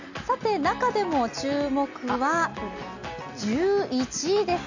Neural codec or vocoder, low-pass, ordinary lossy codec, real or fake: none; 7.2 kHz; none; real